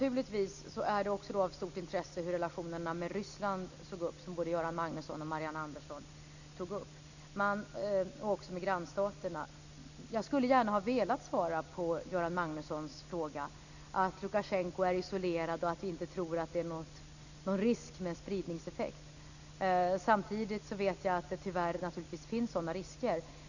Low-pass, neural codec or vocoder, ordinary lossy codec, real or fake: 7.2 kHz; none; none; real